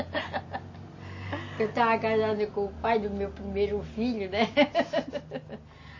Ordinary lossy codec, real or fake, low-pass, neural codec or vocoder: MP3, 32 kbps; real; 7.2 kHz; none